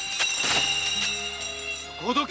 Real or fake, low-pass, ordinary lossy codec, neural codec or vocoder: real; none; none; none